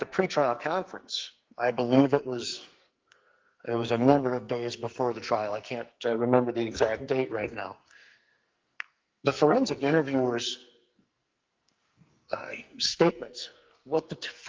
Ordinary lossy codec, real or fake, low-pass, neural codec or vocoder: Opus, 32 kbps; fake; 7.2 kHz; codec, 44.1 kHz, 2.6 kbps, SNAC